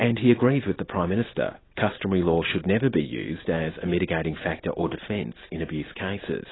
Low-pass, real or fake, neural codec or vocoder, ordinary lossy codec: 7.2 kHz; real; none; AAC, 16 kbps